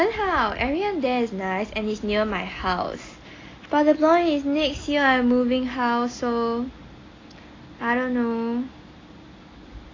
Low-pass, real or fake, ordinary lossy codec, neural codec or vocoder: 7.2 kHz; real; AAC, 32 kbps; none